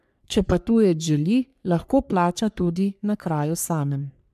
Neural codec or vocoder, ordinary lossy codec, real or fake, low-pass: codec, 44.1 kHz, 3.4 kbps, Pupu-Codec; MP3, 96 kbps; fake; 14.4 kHz